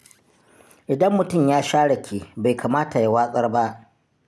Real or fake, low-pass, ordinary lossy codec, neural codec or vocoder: real; none; none; none